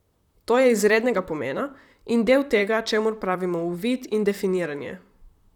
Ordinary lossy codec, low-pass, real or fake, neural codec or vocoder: none; 19.8 kHz; fake; vocoder, 44.1 kHz, 128 mel bands, Pupu-Vocoder